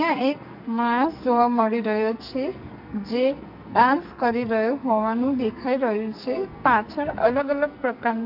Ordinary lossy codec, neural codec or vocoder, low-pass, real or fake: none; codec, 44.1 kHz, 2.6 kbps, SNAC; 5.4 kHz; fake